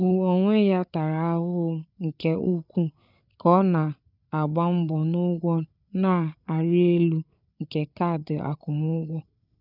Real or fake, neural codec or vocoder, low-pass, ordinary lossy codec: fake; codec, 16 kHz, 8 kbps, FreqCodec, larger model; 5.4 kHz; none